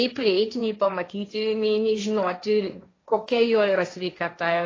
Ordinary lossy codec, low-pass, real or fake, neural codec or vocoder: AAC, 32 kbps; 7.2 kHz; fake; codec, 16 kHz, 1.1 kbps, Voila-Tokenizer